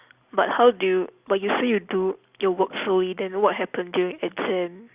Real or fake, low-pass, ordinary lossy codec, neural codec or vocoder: real; 3.6 kHz; Opus, 64 kbps; none